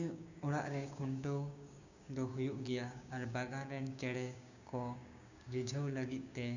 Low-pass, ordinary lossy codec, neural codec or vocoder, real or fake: 7.2 kHz; none; codec, 16 kHz, 6 kbps, DAC; fake